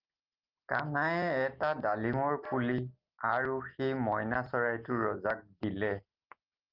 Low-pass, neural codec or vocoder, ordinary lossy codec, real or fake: 5.4 kHz; none; Opus, 32 kbps; real